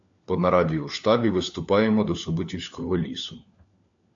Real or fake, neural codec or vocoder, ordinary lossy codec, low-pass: fake; codec, 16 kHz, 4 kbps, FunCodec, trained on LibriTTS, 50 frames a second; AAC, 64 kbps; 7.2 kHz